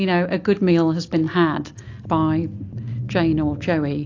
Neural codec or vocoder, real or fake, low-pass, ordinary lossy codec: none; real; 7.2 kHz; AAC, 48 kbps